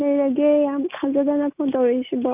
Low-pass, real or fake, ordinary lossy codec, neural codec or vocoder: 3.6 kHz; real; none; none